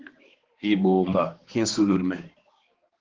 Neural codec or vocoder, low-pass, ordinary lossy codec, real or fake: codec, 16 kHz, 2 kbps, X-Codec, HuBERT features, trained on balanced general audio; 7.2 kHz; Opus, 16 kbps; fake